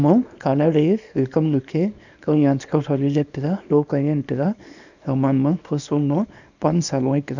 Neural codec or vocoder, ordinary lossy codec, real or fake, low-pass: codec, 24 kHz, 0.9 kbps, WavTokenizer, small release; none; fake; 7.2 kHz